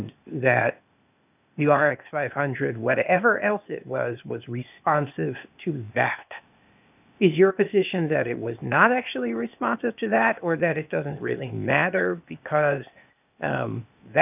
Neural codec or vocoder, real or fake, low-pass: codec, 16 kHz, 0.8 kbps, ZipCodec; fake; 3.6 kHz